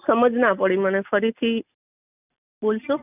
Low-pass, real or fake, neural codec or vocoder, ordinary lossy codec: 3.6 kHz; real; none; none